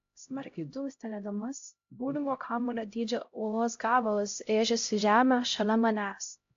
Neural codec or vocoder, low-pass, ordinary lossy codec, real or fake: codec, 16 kHz, 0.5 kbps, X-Codec, HuBERT features, trained on LibriSpeech; 7.2 kHz; AAC, 48 kbps; fake